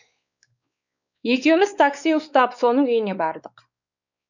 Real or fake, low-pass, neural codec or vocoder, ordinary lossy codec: fake; 7.2 kHz; codec, 16 kHz, 4 kbps, X-Codec, WavLM features, trained on Multilingual LibriSpeech; none